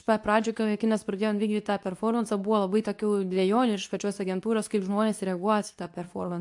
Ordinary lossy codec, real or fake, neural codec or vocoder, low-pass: AAC, 64 kbps; fake; codec, 24 kHz, 0.9 kbps, WavTokenizer, medium speech release version 2; 10.8 kHz